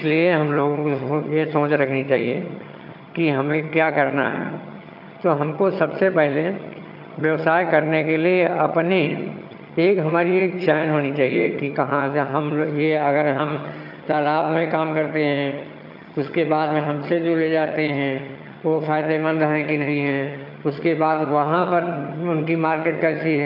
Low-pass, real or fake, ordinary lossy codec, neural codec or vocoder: 5.4 kHz; fake; none; vocoder, 22.05 kHz, 80 mel bands, HiFi-GAN